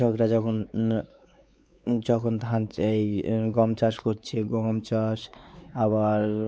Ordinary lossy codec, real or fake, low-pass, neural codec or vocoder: none; fake; none; codec, 16 kHz, 4 kbps, X-Codec, WavLM features, trained on Multilingual LibriSpeech